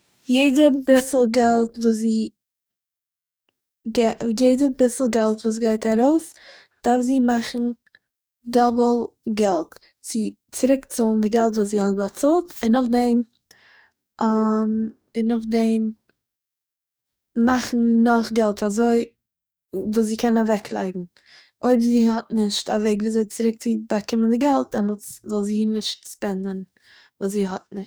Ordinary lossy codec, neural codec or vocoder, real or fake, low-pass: none; codec, 44.1 kHz, 2.6 kbps, DAC; fake; none